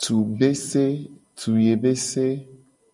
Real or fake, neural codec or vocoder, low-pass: real; none; 10.8 kHz